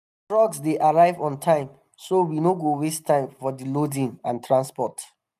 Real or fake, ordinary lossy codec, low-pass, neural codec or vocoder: real; none; 14.4 kHz; none